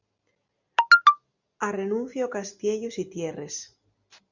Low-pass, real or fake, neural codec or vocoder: 7.2 kHz; real; none